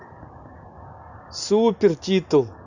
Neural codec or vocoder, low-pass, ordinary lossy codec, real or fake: none; 7.2 kHz; none; real